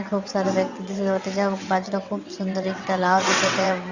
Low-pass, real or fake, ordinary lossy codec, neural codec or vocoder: 7.2 kHz; fake; Opus, 64 kbps; vocoder, 22.05 kHz, 80 mel bands, WaveNeXt